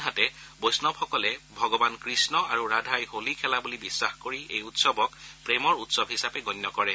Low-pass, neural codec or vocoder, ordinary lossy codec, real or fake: none; none; none; real